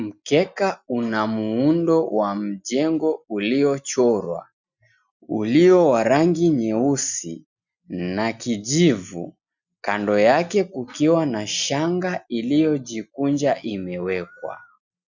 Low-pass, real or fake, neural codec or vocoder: 7.2 kHz; real; none